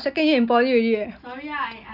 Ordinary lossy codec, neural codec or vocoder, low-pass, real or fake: none; none; 5.4 kHz; real